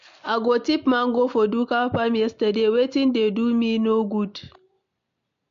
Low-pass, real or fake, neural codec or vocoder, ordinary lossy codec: 7.2 kHz; real; none; MP3, 48 kbps